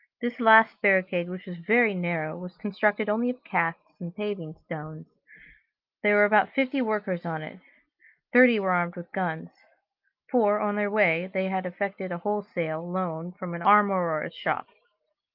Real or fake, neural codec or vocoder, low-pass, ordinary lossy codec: real; none; 5.4 kHz; Opus, 32 kbps